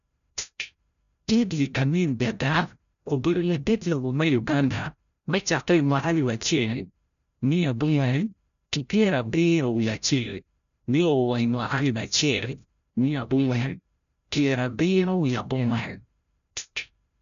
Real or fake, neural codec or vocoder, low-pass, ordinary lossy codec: fake; codec, 16 kHz, 0.5 kbps, FreqCodec, larger model; 7.2 kHz; none